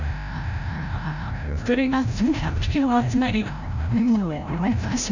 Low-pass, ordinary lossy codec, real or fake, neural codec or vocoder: 7.2 kHz; none; fake; codec, 16 kHz, 0.5 kbps, FreqCodec, larger model